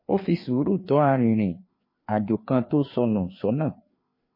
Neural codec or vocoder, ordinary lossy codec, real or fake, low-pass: codec, 16 kHz, 2 kbps, FreqCodec, larger model; MP3, 24 kbps; fake; 5.4 kHz